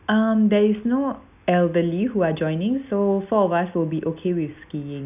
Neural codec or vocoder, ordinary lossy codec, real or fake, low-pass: none; none; real; 3.6 kHz